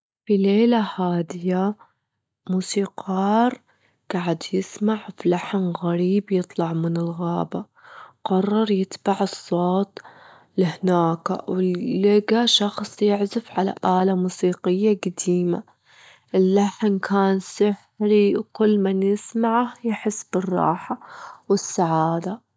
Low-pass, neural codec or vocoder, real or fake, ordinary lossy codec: none; none; real; none